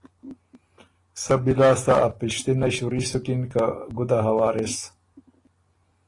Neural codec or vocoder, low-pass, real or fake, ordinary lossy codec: none; 10.8 kHz; real; AAC, 32 kbps